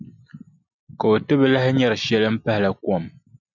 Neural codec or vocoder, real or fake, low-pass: none; real; 7.2 kHz